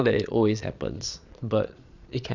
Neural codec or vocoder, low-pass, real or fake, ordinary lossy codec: vocoder, 22.05 kHz, 80 mel bands, Vocos; 7.2 kHz; fake; none